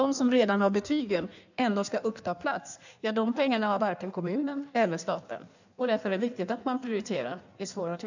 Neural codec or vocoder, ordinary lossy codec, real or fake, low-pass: codec, 16 kHz in and 24 kHz out, 1.1 kbps, FireRedTTS-2 codec; none; fake; 7.2 kHz